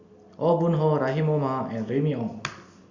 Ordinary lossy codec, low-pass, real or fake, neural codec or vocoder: Opus, 64 kbps; 7.2 kHz; real; none